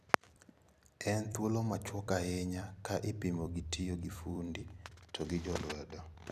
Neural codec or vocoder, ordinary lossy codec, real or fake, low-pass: vocoder, 44.1 kHz, 128 mel bands every 256 samples, BigVGAN v2; none; fake; 14.4 kHz